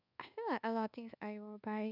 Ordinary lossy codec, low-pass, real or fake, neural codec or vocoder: none; 5.4 kHz; fake; codec, 24 kHz, 1.2 kbps, DualCodec